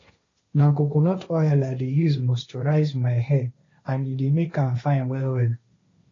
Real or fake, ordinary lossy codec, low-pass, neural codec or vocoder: fake; AAC, 32 kbps; 7.2 kHz; codec, 16 kHz, 1.1 kbps, Voila-Tokenizer